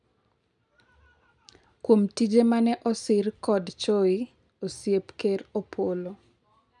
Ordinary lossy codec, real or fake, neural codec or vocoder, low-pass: none; real; none; 10.8 kHz